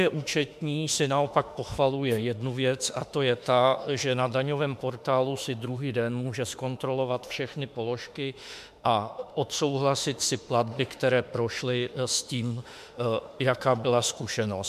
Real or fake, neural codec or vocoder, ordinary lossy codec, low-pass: fake; autoencoder, 48 kHz, 32 numbers a frame, DAC-VAE, trained on Japanese speech; MP3, 96 kbps; 14.4 kHz